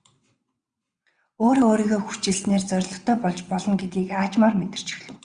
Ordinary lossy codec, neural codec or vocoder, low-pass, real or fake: Opus, 64 kbps; vocoder, 22.05 kHz, 80 mel bands, WaveNeXt; 9.9 kHz; fake